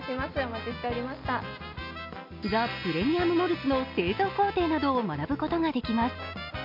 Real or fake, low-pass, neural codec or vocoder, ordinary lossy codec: real; 5.4 kHz; none; MP3, 48 kbps